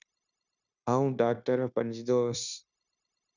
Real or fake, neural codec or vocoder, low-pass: fake; codec, 16 kHz, 0.9 kbps, LongCat-Audio-Codec; 7.2 kHz